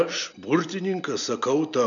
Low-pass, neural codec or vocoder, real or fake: 7.2 kHz; none; real